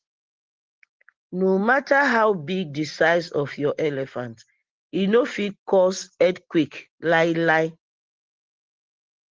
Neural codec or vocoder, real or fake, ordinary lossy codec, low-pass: none; real; Opus, 16 kbps; 7.2 kHz